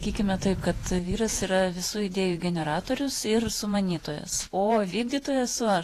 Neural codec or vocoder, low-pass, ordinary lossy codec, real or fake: vocoder, 48 kHz, 128 mel bands, Vocos; 14.4 kHz; AAC, 48 kbps; fake